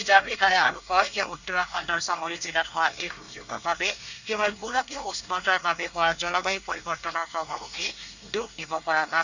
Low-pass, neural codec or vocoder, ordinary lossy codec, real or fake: 7.2 kHz; codec, 24 kHz, 1 kbps, SNAC; none; fake